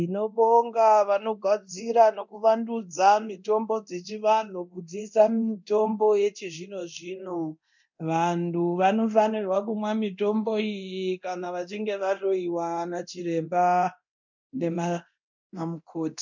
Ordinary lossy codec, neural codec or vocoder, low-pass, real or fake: MP3, 64 kbps; codec, 24 kHz, 0.9 kbps, DualCodec; 7.2 kHz; fake